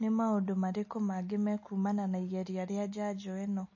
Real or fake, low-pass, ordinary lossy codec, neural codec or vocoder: real; 7.2 kHz; MP3, 32 kbps; none